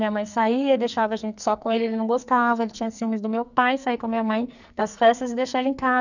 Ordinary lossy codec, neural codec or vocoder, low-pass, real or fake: none; codec, 44.1 kHz, 2.6 kbps, SNAC; 7.2 kHz; fake